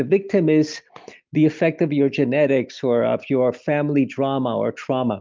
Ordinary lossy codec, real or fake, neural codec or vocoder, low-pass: Opus, 24 kbps; real; none; 7.2 kHz